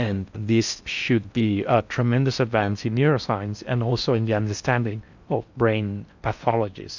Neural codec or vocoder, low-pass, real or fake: codec, 16 kHz in and 24 kHz out, 0.6 kbps, FocalCodec, streaming, 4096 codes; 7.2 kHz; fake